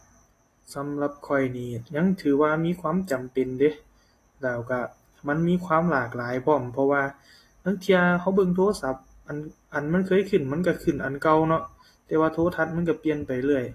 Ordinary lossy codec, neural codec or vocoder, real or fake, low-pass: AAC, 48 kbps; none; real; 14.4 kHz